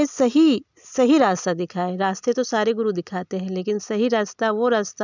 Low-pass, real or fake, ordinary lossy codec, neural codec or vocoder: 7.2 kHz; real; none; none